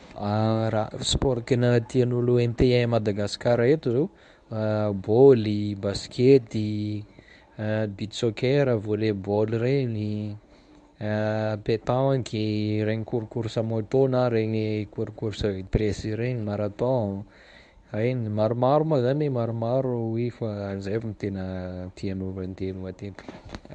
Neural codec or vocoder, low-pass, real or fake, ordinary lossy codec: codec, 24 kHz, 0.9 kbps, WavTokenizer, medium speech release version 1; 10.8 kHz; fake; none